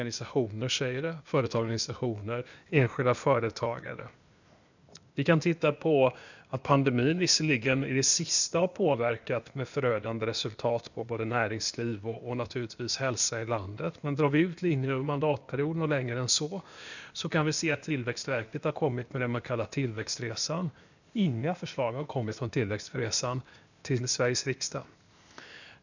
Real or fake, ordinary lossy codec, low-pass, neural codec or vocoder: fake; none; 7.2 kHz; codec, 16 kHz, 0.8 kbps, ZipCodec